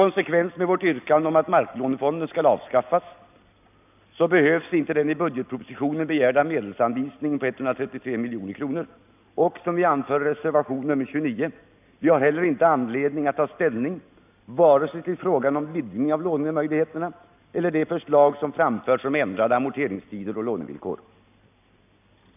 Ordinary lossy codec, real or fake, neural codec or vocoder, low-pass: none; real; none; 3.6 kHz